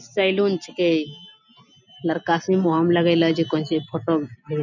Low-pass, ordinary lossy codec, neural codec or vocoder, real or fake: 7.2 kHz; none; none; real